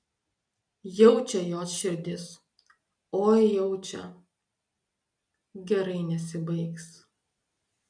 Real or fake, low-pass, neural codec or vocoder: real; 9.9 kHz; none